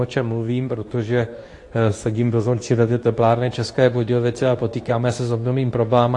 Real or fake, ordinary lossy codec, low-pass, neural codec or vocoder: fake; AAC, 48 kbps; 10.8 kHz; codec, 24 kHz, 0.9 kbps, WavTokenizer, medium speech release version 2